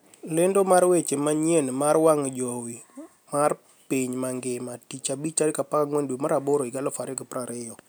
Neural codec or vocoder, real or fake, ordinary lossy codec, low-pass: none; real; none; none